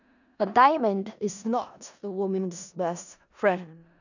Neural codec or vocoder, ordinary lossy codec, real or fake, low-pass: codec, 16 kHz in and 24 kHz out, 0.4 kbps, LongCat-Audio-Codec, four codebook decoder; none; fake; 7.2 kHz